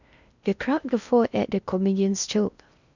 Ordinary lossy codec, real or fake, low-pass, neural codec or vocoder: none; fake; 7.2 kHz; codec, 16 kHz in and 24 kHz out, 0.6 kbps, FocalCodec, streaming, 4096 codes